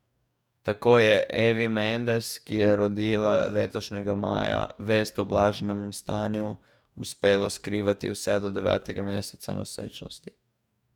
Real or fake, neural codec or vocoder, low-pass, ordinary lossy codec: fake; codec, 44.1 kHz, 2.6 kbps, DAC; 19.8 kHz; none